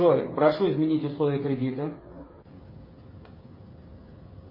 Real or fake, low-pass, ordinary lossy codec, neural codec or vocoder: fake; 5.4 kHz; MP3, 24 kbps; codec, 16 kHz, 8 kbps, FreqCodec, smaller model